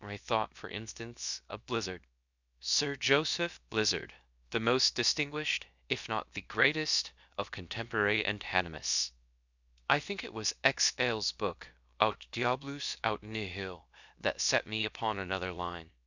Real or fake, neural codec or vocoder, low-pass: fake; codec, 16 kHz, about 1 kbps, DyCAST, with the encoder's durations; 7.2 kHz